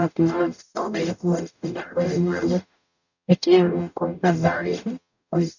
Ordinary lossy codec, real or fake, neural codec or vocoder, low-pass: none; fake; codec, 44.1 kHz, 0.9 kbps, DAC; 7.2 kHz